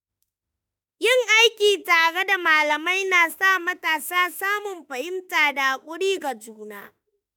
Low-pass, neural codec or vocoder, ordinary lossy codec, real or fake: none; autoencoder, 48 kHz, 32 numbers a frame, DAC-VAE, trained on Japanese speech; none; fake